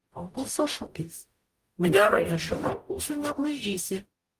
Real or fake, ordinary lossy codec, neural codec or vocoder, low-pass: fake; Opus, 32 kbps; codec, 44.1 kHz, 0.9 kbps, DAC; 14.4 kHz